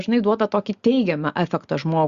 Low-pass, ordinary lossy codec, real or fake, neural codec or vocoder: 7.2 kHz; Opus, 64 kbps; real; none